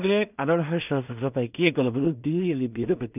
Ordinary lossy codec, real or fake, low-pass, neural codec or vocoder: none; fake; 3.6 kHz; codec, 16 kHz in and 24 kHz out, 0.4 kbps, LongCat-Audio-Codec, two codebook decoder